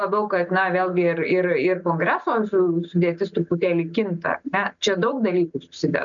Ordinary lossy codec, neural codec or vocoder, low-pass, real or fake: MP3, 96 kbps; none; 7.2 kHz; real